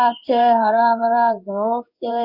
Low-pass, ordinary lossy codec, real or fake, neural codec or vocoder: 5.4 kHz; Opus, 64 kbps; fake; codec, 16 kHz in and 24 kHz out, 1 kbps, XY-Tokenizer